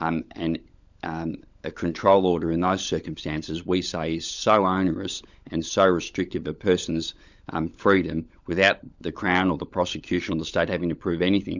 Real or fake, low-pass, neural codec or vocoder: fake; 7.2 kHz; codec, 16 kHz, 16 kbps, FunCodec, trained on LibriTTS, 50 frames a second